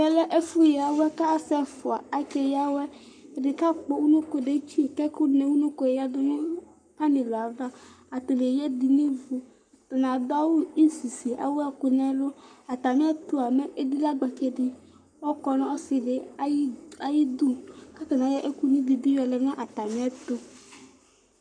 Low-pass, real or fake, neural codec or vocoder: 9.9 kHz; fake; codec, 44.1 kHz, 7.8 kbps, Pupu-Codec